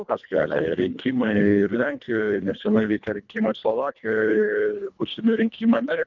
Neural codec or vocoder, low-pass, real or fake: codec, 24 kHz, 1.5 kbps, HILCodec; 7.2 kHz; fake